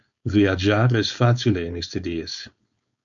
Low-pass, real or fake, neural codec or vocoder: 7.2 kHz; fake; codec, 16 kHz, 4.8 kbps, FACodec